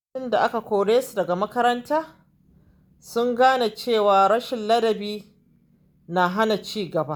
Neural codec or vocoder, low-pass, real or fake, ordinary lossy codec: none; none; real; none